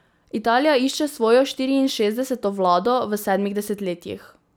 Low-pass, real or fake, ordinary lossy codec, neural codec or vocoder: none; real; none; none